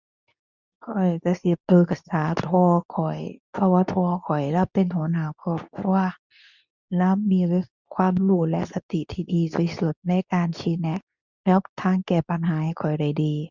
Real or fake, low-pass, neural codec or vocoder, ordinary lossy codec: fake; 7.2 kHz; codec, 24 kHz, 0.9 kbps, WavTokenizer, medium speech release version 2; none